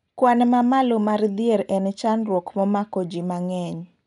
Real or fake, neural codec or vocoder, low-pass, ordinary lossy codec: real; none; 10.8 kHz; none